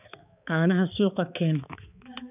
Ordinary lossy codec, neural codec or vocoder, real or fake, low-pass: none; codec, 16 kHz, 4 kbps, X-Codec, HuBERT features, trained on balanced general audio; fake; 3.6 kHz